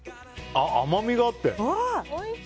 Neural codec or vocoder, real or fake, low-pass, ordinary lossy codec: none; real; none; none